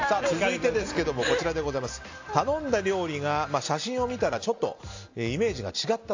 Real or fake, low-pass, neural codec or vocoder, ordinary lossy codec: real; 7.2 kHz; none; AAC, 48 kbps